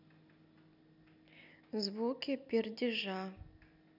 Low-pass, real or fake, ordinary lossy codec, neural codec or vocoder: 5.4 kHz; real; none; none